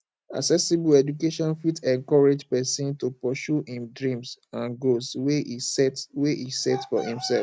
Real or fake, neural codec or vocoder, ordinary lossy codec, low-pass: real; none; none; none